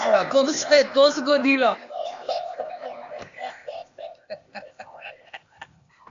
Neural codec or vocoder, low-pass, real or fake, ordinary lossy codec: codec, 16 kHz, 0.8 kbps, ZipCodec; 7.2 kHz; fake; AAC, 64 kbps